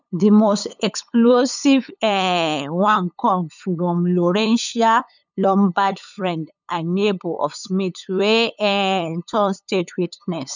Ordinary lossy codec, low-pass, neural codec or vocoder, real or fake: none; 7.2 kHz; codec, 16 kHz, 8 kbps, FunCodec, trained on LibriTTS, 25 frames a second; fake